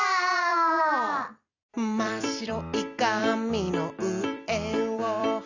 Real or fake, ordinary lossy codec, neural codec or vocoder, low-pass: fake; Opus, 64 kbps; vocoder, 44.1 kHz, 128 mel bands every 256 samples, BigVGAN v2; 7.2 kHz